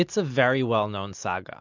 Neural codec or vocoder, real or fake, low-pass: none; real; 7.2 kHz